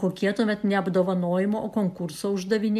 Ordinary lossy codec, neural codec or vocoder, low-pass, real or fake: AAC, 96 kbps; none; 14.4 kHz; real